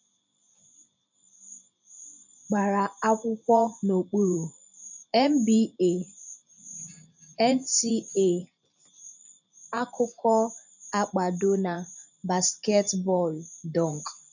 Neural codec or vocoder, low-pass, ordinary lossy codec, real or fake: vocoder, 44.1 kHz, 128 mel bands every 512 samples, BigVGAN v2; 7.2 kHz; none; fake